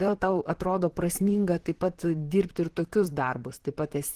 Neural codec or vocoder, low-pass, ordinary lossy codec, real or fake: vocoder, 44.1 kHz, 128 mel bands, Pupu-Vocoder; 14.4 kHz; Opus, 16 kbps; fake